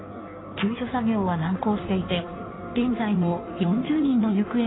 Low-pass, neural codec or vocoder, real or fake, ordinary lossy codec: 7.2 kHz; codec, 16 kHz in and 24 kHz out, 1.1 kbps, FireRedTTS-2 codec; fake; AAC, 16 kbps